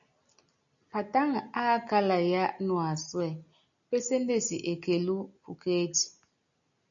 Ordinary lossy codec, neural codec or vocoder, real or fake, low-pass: MP3, 96 kbps; none; real; 7.2 kHz